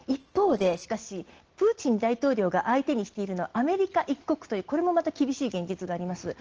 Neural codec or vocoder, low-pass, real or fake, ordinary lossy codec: none; 7.2 kHz; real; Opus, 16 kbps